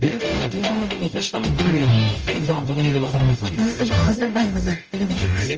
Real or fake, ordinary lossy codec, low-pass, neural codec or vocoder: fake; Opus, 24 kbps; 7.2 kHz; codec, 44.1 kHz, 0.9 kbps, DAC